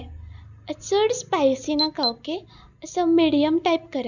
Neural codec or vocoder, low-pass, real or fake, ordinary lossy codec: none; 7.2 kHz; real; none